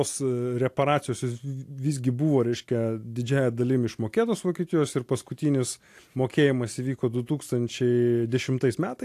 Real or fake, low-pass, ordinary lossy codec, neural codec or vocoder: real; 14.4 kHz; AAC, 64 kbps; none